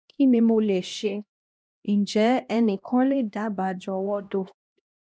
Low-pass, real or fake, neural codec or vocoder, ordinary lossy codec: none; fake; codec, 16 kHz, 1 kbps, X-Codec, HuBERT features, trained on LibriSpeech; none